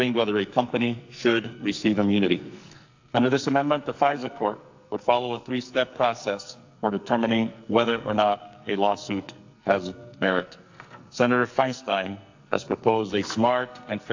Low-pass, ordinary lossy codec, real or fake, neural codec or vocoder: 7.2 kHz; AAC, 48 kbps; fake; codec, 44.1 kHz, 2.6 kbps, SNAC